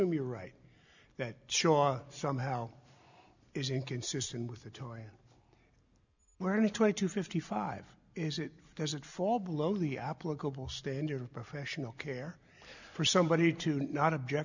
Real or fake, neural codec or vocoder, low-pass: real; none; 7.2 kHz